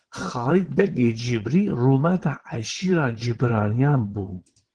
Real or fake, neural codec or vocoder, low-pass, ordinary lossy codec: fake; vocoder, 22.05 kHz, 80 mel bands, WaveNeXt; 9.9 kHz; Opus, 16 kbps